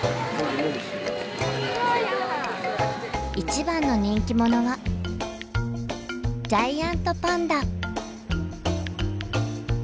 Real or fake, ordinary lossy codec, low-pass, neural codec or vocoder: real; none; none; none